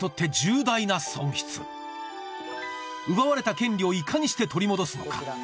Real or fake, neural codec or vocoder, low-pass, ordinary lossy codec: real; none; none; none